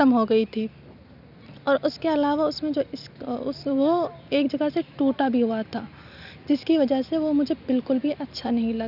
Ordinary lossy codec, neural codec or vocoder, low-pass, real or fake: none; none; 5.4 kHz; real